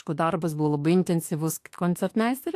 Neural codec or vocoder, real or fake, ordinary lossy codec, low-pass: autoencoder, 48 kHz, 32 numbers a frame, DAC-VAE, trained on Japanese speech; fake; AAC, 64 kbps; 14.4 kHz